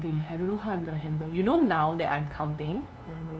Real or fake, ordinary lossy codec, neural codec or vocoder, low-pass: fake; none; codec, 16 kHz, 2 kbps, FunCodec, trained on LibriTTS, 25 frames a second; none